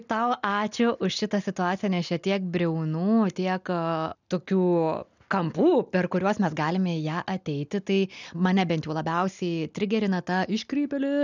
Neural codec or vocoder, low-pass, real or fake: none; 7.2 kHz; real